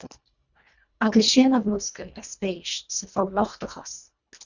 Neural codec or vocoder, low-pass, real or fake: codec, 24 kHz, 1.5 kbps, HILCodec; 7.2 kHz; fake